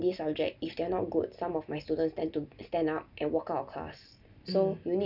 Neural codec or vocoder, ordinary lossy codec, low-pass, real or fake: none; none; 5.4 kHz; real